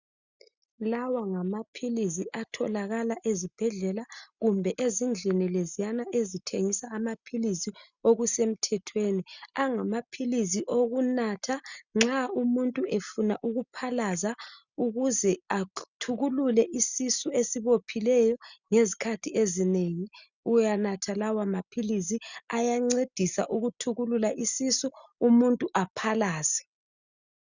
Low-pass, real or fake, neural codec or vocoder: 7.2 kHz; real; none